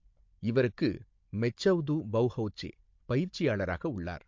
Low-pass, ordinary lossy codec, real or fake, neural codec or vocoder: 7.2 kHz; MP3, 48 kbps; fake; codec, 16 kHz, 16 kbps, FunCodec, trained on Chinese and English, 50 frames a second